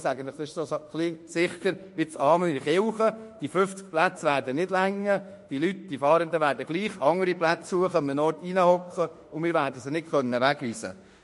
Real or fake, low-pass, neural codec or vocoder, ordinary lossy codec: fake; 14.4 kHz; autoencoder, 48 kHz, 32 numbers a frame, DAC-VAE, trained on Japanese speech; MP3, 48 kbps